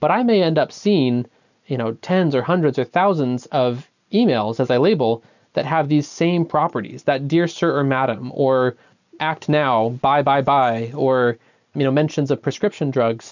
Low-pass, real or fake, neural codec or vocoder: 7.2 kHz; real; none